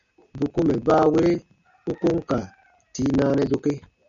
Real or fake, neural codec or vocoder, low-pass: real; none; 7.2 kHz